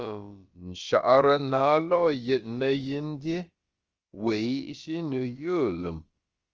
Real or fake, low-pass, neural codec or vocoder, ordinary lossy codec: fake; 7.2 kHz; codec, 16 kHz, about 1 kbps, DyCAST, with the encoder's durations; Opus, 24 kbps